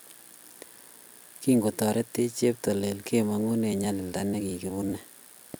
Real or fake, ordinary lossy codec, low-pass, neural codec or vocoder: fake; none; none; vocoder, 44.1 kHz, 128 mel bands every 256 samples, BigVGAN v2